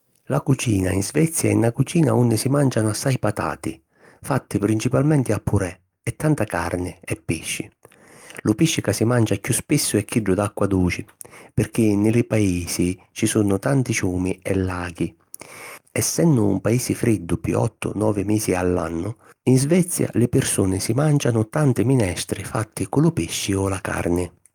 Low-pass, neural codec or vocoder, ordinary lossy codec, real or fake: 19.8 kHz; none; Opus, 32 kbps; real